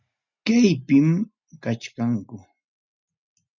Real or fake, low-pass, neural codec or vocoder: real; 7.2 kHz; none